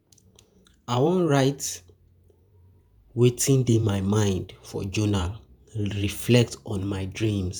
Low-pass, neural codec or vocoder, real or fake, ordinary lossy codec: none; vocoder, 48 kHz, 128 mel bands, Vocos; fake; none